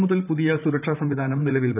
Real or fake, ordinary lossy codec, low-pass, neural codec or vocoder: fake; none; 3.6 kHz; vocoder, 44.1 kHz, 128 mel bands, Pupu-Vocoder